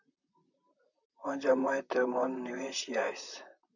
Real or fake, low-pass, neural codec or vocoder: fake; 7.2 kHz; vocoder, 44.1 kHz, 128 mel bands, Pupu-Vocoder